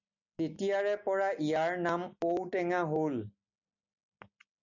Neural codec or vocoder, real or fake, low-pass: none; real; 7.2 kHz